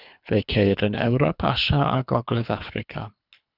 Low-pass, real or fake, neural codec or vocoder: 5.4 kHz; fake; codec, 24 kHz, 3 kbps, HILCodec